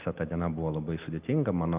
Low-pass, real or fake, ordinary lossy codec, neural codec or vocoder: 3.6 kHz; real; Opus, 24 kbps; none